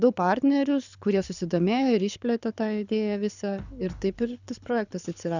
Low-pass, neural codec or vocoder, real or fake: 7.2 kHz; codec, 24 kHz, 6 kbps, HILCodec; fake